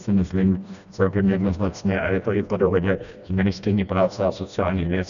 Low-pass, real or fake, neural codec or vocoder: 7.2 kHz; fake; codec, 16 kHz, 1 kbps, FreqCodec, smaller model